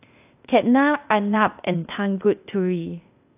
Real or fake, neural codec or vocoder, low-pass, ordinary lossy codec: fake; codec, 16 kHz, 0.8 kbps, ZipCodec; 3.6 kHz; AAC, 32 kbps